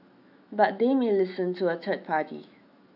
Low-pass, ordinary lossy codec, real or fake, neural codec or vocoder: 5.4 kHz; AAC, 48 kbps; fake; autoencoder, 48 kHz, 128 numbers a frame, DAC-VAE, trained on Japanese speech